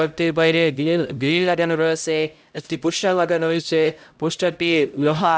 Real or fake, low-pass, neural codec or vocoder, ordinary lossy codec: fake; none; codec, 16 kHz, 0.5 kbps, X-Codec, HuBERT features, trained on LibriSpeech; none